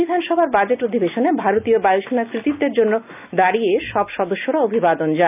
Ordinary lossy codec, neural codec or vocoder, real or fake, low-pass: none; none; real; 3.6 kHz